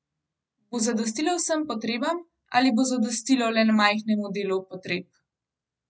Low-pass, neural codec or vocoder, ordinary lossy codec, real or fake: none; none; none; real